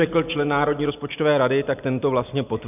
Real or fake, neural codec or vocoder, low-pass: real; none; 3.6 kHz